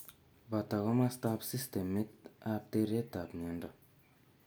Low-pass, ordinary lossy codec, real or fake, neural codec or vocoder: none; none; real; none